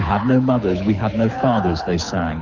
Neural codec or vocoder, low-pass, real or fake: codec, 24 kHz, 6 kbps, HILCodec; 7.2 kHz; fake